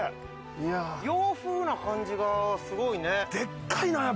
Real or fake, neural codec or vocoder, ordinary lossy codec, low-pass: real; none; none; none